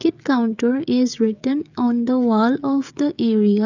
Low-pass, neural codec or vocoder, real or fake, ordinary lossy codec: 7.2 kHz; codec, 16 kHz, 16 kbps, FreqCodec, smaller model; fake; none